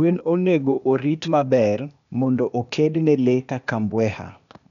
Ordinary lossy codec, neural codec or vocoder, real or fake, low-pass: MP3, 96 kbps; codec, 16 kHz, 0.8 kbps, ZipCodec; fake; 7.2 kHz